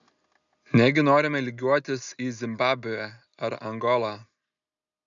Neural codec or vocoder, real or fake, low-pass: none; real; 7.2 kHz